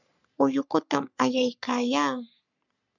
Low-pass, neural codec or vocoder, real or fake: 7.2 kHz; codec, 44.1 kHz, 3.4 kbps, Pupu-Codec; fake